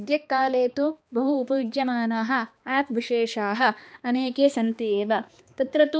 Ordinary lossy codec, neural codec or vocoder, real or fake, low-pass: none; codec, 16 kHz, 2 kbps, X-Codec, HuBERT features, trained on balanced general audio; fake; none